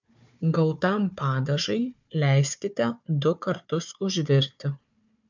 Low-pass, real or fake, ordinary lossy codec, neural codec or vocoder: 7.2 kHz; fake; MP3, 64 kbps; codec, 16 kHz, 4 kbps, FunCodec, trained on Chinese and English, 50 frames a second